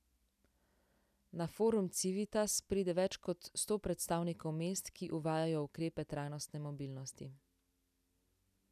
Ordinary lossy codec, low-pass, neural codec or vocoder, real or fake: none; 14.4 kHz; none; real